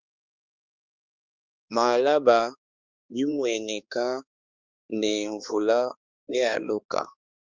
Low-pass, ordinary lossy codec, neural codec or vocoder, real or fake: 7.2 kHz; Opus, 32 kbps; codec, 16 kHz, 2 kbps, X-Codec, HuBERT features, trained on balanced general audio; fake